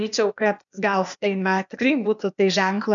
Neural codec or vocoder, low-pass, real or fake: codec, 16 kHz, 0.8 kbps, ZipCodec; 7.2 kHz; fake